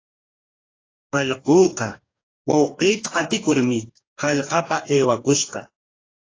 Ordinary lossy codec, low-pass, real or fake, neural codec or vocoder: AAC, 32 kbps; 7.2 kHz; fake; codec, 44.1 kHz, 2.6 kbps, DAC